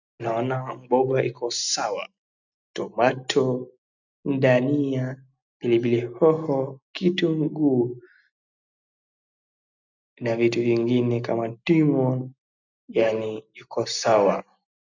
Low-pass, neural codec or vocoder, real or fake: 7.2 kHz; none; real